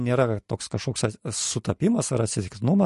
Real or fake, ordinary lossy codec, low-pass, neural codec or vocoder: real; MP3, 48 kbps; 14.4 kHz; none